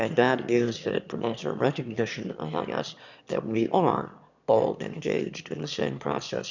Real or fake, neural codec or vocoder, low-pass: fake; autoencoder, 22.05 kHz, a latent of 192 numbers a frame, VITS, trained on one speaker; 7.2 kHz